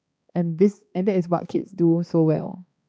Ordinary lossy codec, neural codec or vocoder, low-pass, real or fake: none; codec, 16 kHz, 2 kbps, X-Codec, HuBERT features, trained on balanced general audio; none; fake